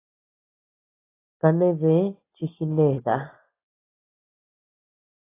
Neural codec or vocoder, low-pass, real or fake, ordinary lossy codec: none; 3.6 kHz; real; AAC, 16 kbps